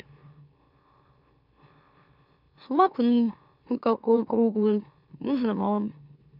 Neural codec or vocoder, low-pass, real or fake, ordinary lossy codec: autoencoder, 44.1 kHz, a latent of 192 numbers a frame, MeloTTS; 5.4 kHz; fake; none